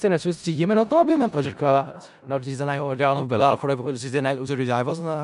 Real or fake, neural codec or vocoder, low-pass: fake; codec, 16 kHz in and 24 kHz out, 0.4 kbps, LongCat-Audio-Codec, four codebook decoder; 10.8 kHz